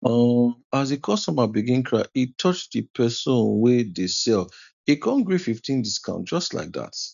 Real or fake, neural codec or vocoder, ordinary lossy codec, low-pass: real; none; none; 7.2 kHz